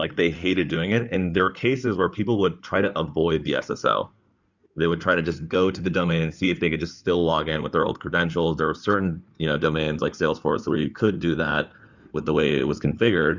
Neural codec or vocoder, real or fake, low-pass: codec, 16 kHz in and 24 kHz out, 2.2 kbps, FireRedTTS-2 codec; fake; 7.2 kHz